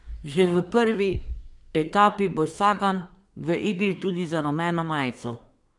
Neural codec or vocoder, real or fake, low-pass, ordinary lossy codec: codec, 24 kHz, 1 kbps, SNAC; fake; 10.8 kHz; MP3, 96 kbps